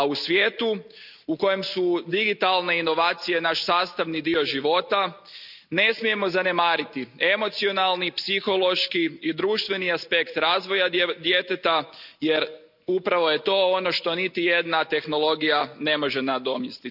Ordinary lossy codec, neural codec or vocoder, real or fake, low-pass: none; none; real; 5.4 kHz